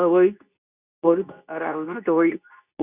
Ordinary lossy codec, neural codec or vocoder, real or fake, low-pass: Opus, 64 kbps; codec, 24 kHz, 0.9 kbps, WavTokenizer, medium speech release version 2; fake; 3.6 kHz